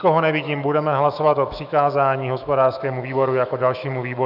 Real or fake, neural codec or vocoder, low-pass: fake; autoencoder, 48 kHz, 128 numbers a frame, DAC-VAE, trained on Japanese speech; 5.4 kHz